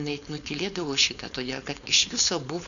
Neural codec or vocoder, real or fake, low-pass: codec, 16 kHz, 4.8 kbps, FACodec; fake; 7.2 kHz